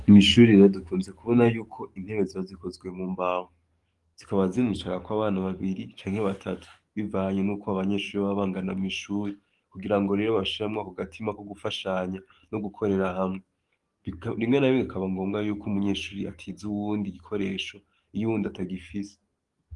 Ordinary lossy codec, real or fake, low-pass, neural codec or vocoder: Opus, 24 kbps; fake; 10.8 kHz; codec, 44.1 kHz, 7.8 kbps, DAC